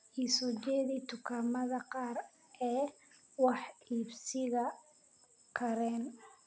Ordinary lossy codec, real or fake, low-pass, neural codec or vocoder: none; real; none; none